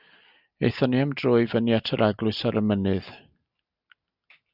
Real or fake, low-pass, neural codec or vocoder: real; 5.4 kHz; none